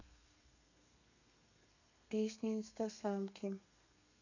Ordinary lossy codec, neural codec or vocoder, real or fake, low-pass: none; codec, 32 kHz, 1.9 kbps, SNAC; fake; 7.2 kHz